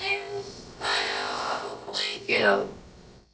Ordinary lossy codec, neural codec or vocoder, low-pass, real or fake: none; codec, 16 kHz, about 1 kbps, DyCAST, with the encoder's durations; none; fake